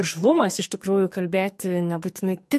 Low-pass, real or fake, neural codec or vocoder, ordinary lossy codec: 14.4 kHz; fake; codec, 44.1 kHz, 2.6 kbps, SNAC; MP3, 64 kbps